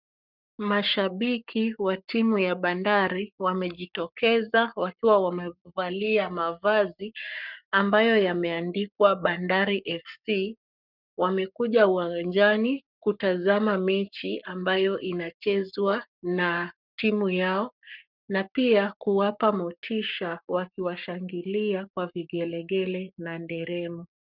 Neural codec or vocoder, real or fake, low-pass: codec, 44.1 kHz, 7.8 kbps, Pupu-Codec; fake; 5.4 kHz